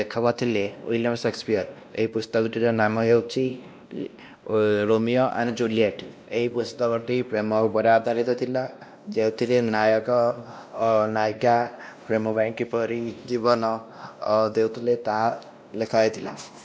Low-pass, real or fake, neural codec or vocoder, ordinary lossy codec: none; fake; codec, 16 kHz, 1 kbps, X-Codec, WavLM features, trained on Multilingual LibriSpeech; none